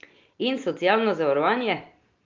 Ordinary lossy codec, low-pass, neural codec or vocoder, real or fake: Opus, 32 kbps; 7.2 kHz; none; real